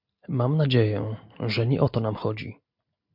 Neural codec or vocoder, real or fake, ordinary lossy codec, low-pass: none; real; MP3, 48 kbps; 5.4 kHz